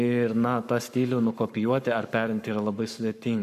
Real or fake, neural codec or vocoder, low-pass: fake; codec, 44.1 kHz, 7.8 kbps, Pupu-Codec; 14.4 kHz